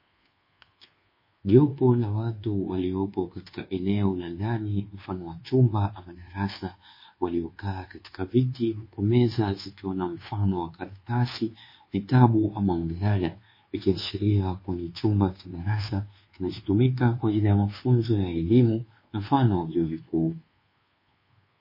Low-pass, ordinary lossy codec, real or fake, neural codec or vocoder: 5.4 kHz; MP3, 24 kbps; fake; codec, 24 kHz, 1.2 kbps, DualCodec